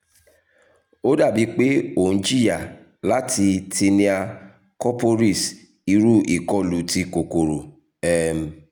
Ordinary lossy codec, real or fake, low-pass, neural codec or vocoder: none; real; none; none